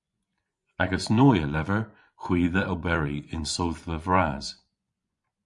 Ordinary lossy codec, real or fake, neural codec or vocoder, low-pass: MP3, 64 kbps; fake; vocoder, 24 kHz, 100 mel bands, Vocos; 10.8 kHz